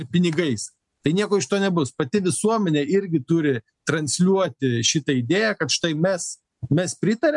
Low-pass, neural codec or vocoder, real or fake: 10.8 kHz; none; real